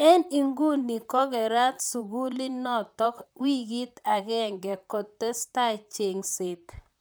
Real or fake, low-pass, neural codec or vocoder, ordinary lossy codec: fake; none; vocoder, 44.1 kHz, 128 mel bands, Pupu-Vocoder; none